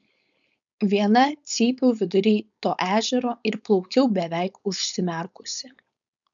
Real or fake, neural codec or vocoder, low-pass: fake; codec, 16 kHz, 4.8 kbps, FACodec; 7.2 kHz